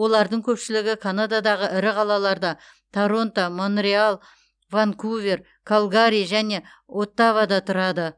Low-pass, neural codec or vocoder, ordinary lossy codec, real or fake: 9.9 kHz; none; none; real